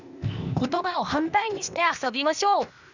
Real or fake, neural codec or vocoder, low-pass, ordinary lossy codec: fake; codec, 16 kHz, 0.8 kbps, ZipCodec; 7.2 kHz; none